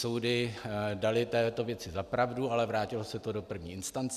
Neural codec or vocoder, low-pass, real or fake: none; 14.4 kHz; real